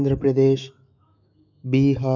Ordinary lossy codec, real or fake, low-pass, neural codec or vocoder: none; fake; 7.2 kHz; autoencoder, 48 kHz, 128 numbers a frame, DAC-VAE, trained on Japanese speech